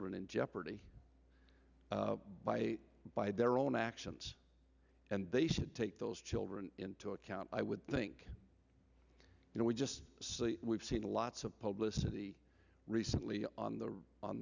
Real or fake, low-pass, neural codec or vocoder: real; 7.2 kHz; none